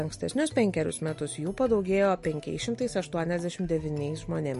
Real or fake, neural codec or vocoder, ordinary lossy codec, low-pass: real; none; MP3, 48 kbps; 14.4 kHz